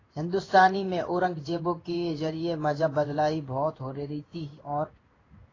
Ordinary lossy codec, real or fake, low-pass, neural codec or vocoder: AAC, 32 kbps; fake; 7.2 kHz; codec, 16 kHz in and 24 kHz out, 1 kbps, XY-Tokenizer